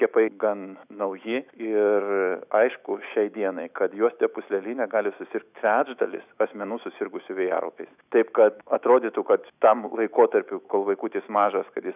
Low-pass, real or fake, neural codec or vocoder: 3.6 kHz; fake; autoencoder, 48 kHz, 128 numbers a frame, DAC-VAE, trained on Japanese speech